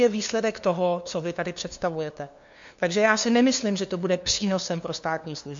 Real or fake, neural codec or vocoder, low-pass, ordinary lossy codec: fake; codec, 16 kHz, 2 kbps, FunCodec, trained on LibriTTS, 25 frames a second; 7.2 kHz; MP3, 48 kbps